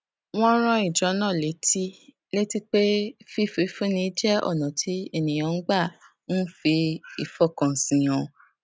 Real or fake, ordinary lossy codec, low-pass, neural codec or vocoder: real; none; none; none